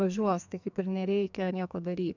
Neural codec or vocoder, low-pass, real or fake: codec, 44.1 kHz, 2.6 kbps, SNAC; 7.2 kHz; fake